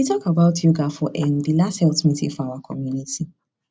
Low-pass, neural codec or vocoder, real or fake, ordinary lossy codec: none; none; real; none